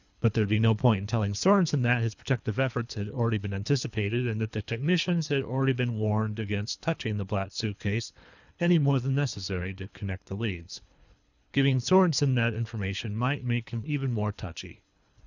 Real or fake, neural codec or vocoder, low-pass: fake; codec, 24 kHz, 3 kbps, HILCodec; 7.2 kHz